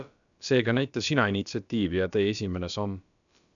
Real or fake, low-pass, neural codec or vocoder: fake; 7.2 kHz; codec, 16 kHz, about 1 kbps, DyCAST, with the encoder's durations